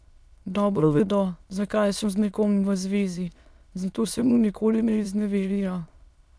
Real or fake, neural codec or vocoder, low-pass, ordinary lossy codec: fake; autoencoder, 22.05 kHz, a latent of 192 numbers a frame, VITS, trained on many speakers; none; none